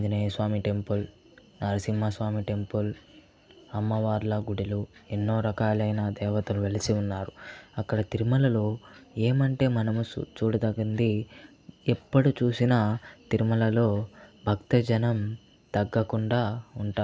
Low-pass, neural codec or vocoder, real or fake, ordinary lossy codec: none; none; real; none